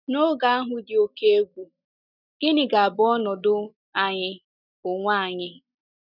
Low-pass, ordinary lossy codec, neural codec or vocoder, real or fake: 5.4 kHz; none; none; real